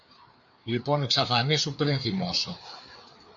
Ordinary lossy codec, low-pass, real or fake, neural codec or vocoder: AAC, 64 kbps; 7.2 kHz; fake; codec, 16 kHz, 4 kbps, FreqCodec, larger model